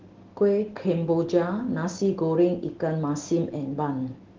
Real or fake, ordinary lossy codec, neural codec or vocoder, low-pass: real; Opus, 16 kbps; none; 7.2 kHz